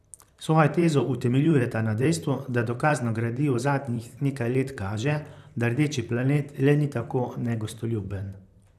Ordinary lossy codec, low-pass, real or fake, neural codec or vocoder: none; 14.4 kHz; fake; vocoder, 44.1 kHz, 128 mel bands, Pupu-Vocoder